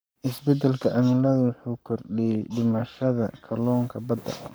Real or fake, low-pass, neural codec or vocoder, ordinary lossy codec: fake; none; codec, 44.1 kHz, 7.8 kbps, Pupu-Codec; none